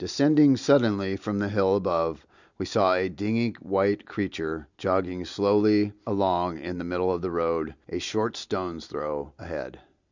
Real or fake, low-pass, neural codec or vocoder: real; 7.2 kHz; none